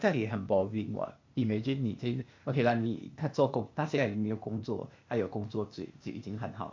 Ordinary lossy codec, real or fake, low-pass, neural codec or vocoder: MP3, 48 kbps; fake; 7.2 kHz; codec, 16 kHz, 0.8 kbps, ZipCodec